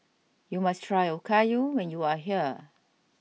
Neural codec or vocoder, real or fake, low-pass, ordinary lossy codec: none; real; none; none